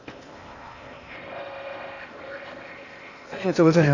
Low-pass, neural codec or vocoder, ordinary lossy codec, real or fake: 7.2 kHz; codec, 16 kHz in and 24 kHz out, 0.8 kbps, FocalCodec, streaming, 65536 codes; none; fake